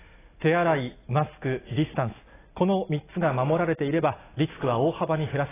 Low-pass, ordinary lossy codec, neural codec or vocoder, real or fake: 3.6 kHz; AAC, 16 kbps; none; real